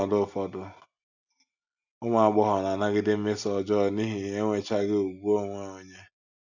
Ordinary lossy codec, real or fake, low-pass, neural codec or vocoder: none; real; 7.2 kHz; none